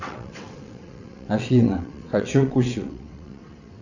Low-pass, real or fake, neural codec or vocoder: 7.2 kHz; fake; vocoder, 22.05 kHz, 80 mel bands, WaveNeXt